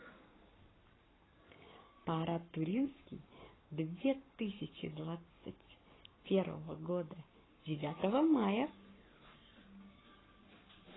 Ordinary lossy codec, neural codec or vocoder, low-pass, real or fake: AAC, 16 kbps; codec, 44.1 kHz, 7.8 kbps, Pupu-Codec; 7.2 kHz; fake